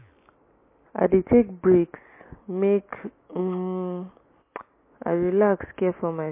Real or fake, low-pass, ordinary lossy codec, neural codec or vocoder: real; 3.6 kHz; MP3, 24 kbps; none